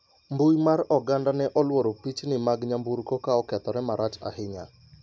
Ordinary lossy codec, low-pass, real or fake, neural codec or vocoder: none; none; real; none